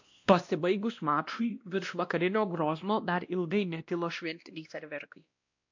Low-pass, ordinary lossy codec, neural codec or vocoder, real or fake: 7.2 kHz; AAC, 48 kbps; codec, 16 kHz, 1 kbps, X-Codec, WavLM features, trained on Multilingual LibriSpeech; fake